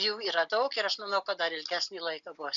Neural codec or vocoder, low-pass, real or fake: none; 7.2 kHz; real